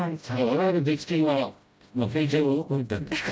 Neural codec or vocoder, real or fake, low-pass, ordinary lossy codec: codec, 16 kHz, 0.5 kbps, FreqCodec, smaller model; fake; none; none